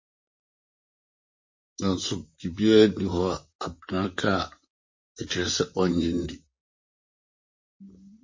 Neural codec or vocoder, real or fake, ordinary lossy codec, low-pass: vocoder, 44.1 kHz, 128 mel bands, Pupu-Vocoder; fake; MP3, 32 kbps; 7.2 kHz